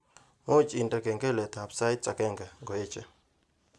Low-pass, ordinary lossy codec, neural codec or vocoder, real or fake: none; none; none; real